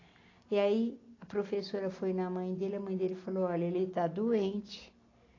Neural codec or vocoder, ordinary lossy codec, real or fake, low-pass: none; AAC, 32 kbps; real; 7.2 kHz